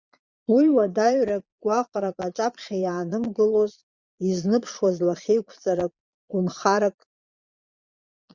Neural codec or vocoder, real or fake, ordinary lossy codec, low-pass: vocoder, 22.05 kHz, 80 mel bands, Vocos; fake; Opus, 64 kbps; 7.2 kHz